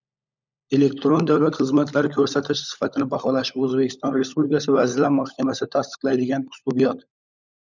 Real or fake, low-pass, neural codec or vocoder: fake; 7.2 kHz; codec, 16 kHz, 16 kbps, FunCodec, trained on LibriTTS, 50 frames a second